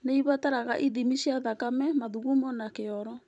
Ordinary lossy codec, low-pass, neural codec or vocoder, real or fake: none; 10.8 kHz; vocoder, 44.1 kHz, 128 mel bands every 512 samples, BigVGAN v2; fake